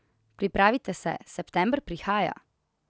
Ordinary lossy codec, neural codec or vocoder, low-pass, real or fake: none; none; none; real